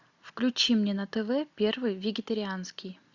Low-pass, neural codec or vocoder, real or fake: 7.2 kHz; none; real